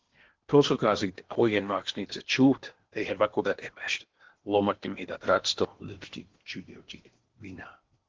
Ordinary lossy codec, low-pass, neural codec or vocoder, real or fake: Opus, 16 kbps; 7.2 kHz; codec, 16 kHz in and 24 kHz out, 0.8 kbps, FocalCodec, streaming, 65536 codes; fake